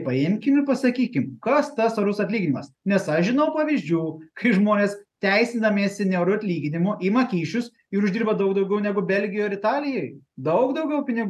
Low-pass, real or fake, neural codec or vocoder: 14.4 kHz; real; none